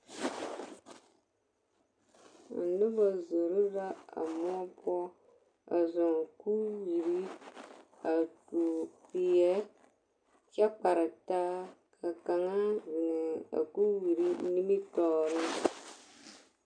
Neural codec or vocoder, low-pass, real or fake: none; 9.9 kHz; real